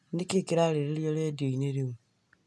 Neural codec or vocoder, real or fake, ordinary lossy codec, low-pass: none; real; none; none